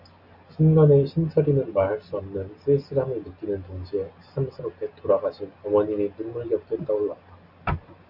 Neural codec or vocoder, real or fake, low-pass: none; real; 5.4 kHz